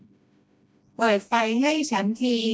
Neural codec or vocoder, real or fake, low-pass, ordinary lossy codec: codec, 16 kHz, 1 kbps, FreqCodec, smaller model; fake; none; none